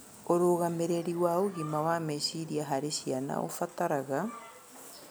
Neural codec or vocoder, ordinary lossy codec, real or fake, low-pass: none; none; real; none